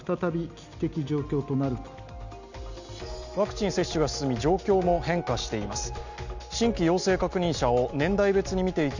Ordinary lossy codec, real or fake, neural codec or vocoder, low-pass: none; real; none; 7.2 kHz